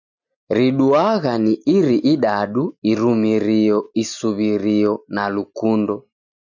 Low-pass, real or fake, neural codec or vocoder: 7.2 kHz; real; none